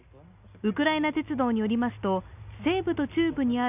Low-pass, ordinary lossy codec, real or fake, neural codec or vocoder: 3.6 kHz; none; real; none